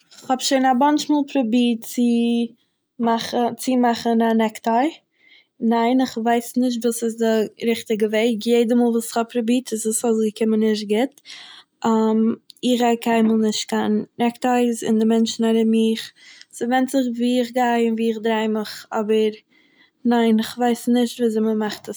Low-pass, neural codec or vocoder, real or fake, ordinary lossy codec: none; none; real; none